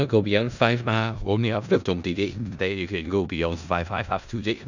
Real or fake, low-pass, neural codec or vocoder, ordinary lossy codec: fake; 7.2 kHz; codec, 16 kHz in and 24 kHz out, 0.4 kbps, LongCat-Audio-Codec, four codebook decoder; none